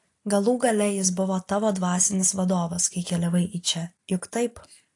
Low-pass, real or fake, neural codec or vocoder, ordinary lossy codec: 10.8 kHz; real; none; AAC, 48 kbps